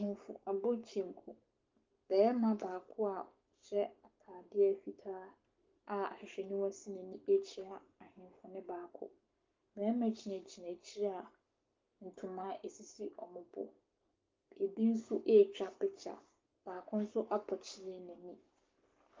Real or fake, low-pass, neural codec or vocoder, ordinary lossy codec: fake; 7.2 kHz; codec, 44.1 kHz, 7.8 kbps, Pupu-Codec; Opus, 24 kbps